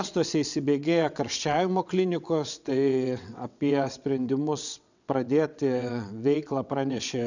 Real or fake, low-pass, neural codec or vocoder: fake; 7.2 kHz; vocoder, 22.05 kHz, 80 mel bands, WaveNeXt